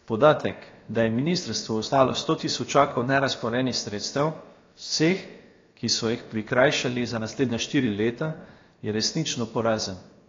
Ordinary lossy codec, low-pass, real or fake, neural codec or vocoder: AAC, 32 kbps; 7.2 kHz; fake; codec, 16 kHz, about 1 kbps, DyCAST, with the encoder's durations